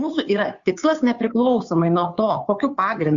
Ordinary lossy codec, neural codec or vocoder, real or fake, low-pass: Opus, 64 kbps; codec, 16 kHz, 2 kbps, FunCodec, trained on Chinese and English, 25 frames a second; fake; 7.2 kHz